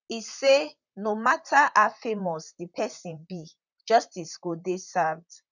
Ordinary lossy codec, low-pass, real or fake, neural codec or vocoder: none; 7.2 kHz; fake; vocoder, 44.1 kHz, 128 mel bands, Pupu-Vocoder